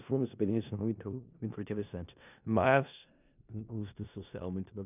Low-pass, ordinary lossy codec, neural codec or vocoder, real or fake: 3.6 kHz; none; codec, 16 kHz in and 24 kHz out, 0.4 kbps, LongCat-Audio-Codec, four codebook decoder; fake